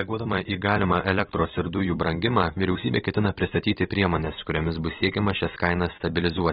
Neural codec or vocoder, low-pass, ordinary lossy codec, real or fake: vocoder, 44.1 kHz, 128 mel bands every 256 samples, BigVGAN v2; 19.8 kHz; AAC, 16 kbps; fake